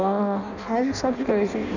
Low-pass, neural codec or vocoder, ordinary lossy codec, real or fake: 7.2 kHz; codec, 16 kHz in and 24 kHz out, 0.6 kbps, FireRedTTS-2 codec; none; fake